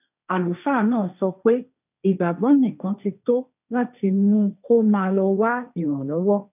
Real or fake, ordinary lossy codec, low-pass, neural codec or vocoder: fake; none; 3.6 kHz; codec, 16 kHz, 1.1 kbps, Voila-Tokenizer